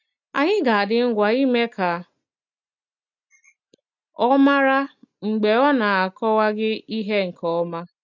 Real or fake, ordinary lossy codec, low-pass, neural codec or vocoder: real; none; 7.2 kHz; none